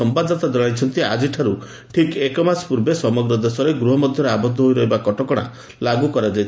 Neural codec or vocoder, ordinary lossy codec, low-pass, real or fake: none; none; none; real